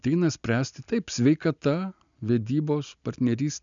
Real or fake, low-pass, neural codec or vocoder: real; 7.2 kHz; none